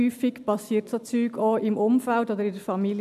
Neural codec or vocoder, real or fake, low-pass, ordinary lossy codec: none; real; 14.4 kHz; none